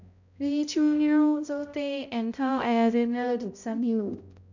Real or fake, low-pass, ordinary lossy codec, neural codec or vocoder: fake; 7.2 kHz; none; codec, 16 kHz, 0.5 kbps, X-Codec, HuBERT features, trained on balanced general audio